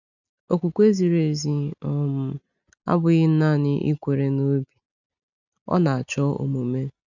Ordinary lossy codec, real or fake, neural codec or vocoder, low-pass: none; real; none; 7.2 kHz